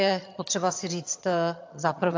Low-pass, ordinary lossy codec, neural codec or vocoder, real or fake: 7.2 kHz; AAC, 48 kbps; vocoder, 22.05 kHz, 80 mel bands, HiFi-GAN; fake